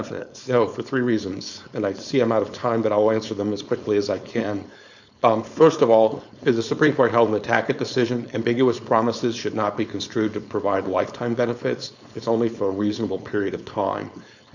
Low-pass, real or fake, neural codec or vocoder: 7.2 kHz; fake; codec, 16 kHz, 4.8 kbps, FACodec